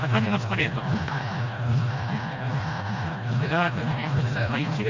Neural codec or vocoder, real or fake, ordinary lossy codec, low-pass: codec, 16 kHz, 1 kbps, FreqCodec, smaller model; fake; MP3, 48 kbps; 7.2 kHz